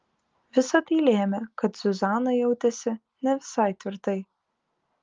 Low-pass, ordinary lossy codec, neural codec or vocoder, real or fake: 7.2 kHz; Opus, 32 kbps; none; real